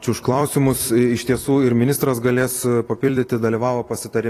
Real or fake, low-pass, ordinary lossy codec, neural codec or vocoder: fake; 14.4 kHz; AAC, 48 kbps; vocoder, 44.1 kHz, 128 mel bands every 256 samples, BigVGAN v2